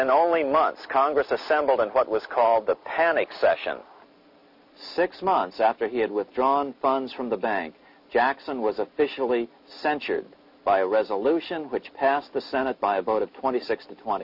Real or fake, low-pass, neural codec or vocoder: real; 5.4 kHz; none